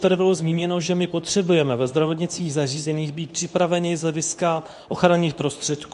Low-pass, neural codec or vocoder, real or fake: 10.8 kHz; codec, 24 kHz, 0.9 kbps, WavTokenizer, medium speech release version 2; fake